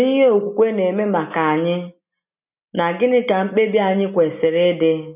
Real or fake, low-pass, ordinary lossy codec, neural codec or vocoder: real; 3.6 kHz; none; none